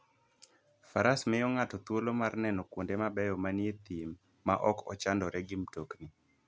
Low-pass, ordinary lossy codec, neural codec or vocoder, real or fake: none; none; none; real